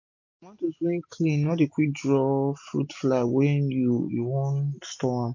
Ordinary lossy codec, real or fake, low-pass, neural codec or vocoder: MP3, 48 kbps; real; 7.2 kHz; none